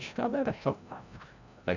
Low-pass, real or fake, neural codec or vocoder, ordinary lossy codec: 7.2 kHz; fake; codec, 16 kHz, 0.5 kbps, FreqCodec, larger model; none